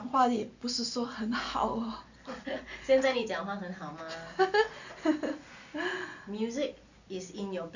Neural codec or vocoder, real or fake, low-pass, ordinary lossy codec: none; real; 7.2 kHz; none